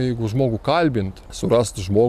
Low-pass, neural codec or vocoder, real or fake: 14.4 kHz; none; real